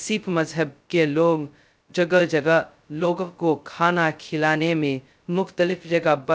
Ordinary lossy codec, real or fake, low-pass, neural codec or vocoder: none; fake; none; codec, 16 kHz, 0.2 kbps, FocalCodec